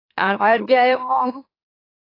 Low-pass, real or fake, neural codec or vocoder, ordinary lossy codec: 5.4 kHz; fake; autoencoder, 44.1 kHz, a latent of 192 numbers a frame, MeloTTS; AAC, 24 kbps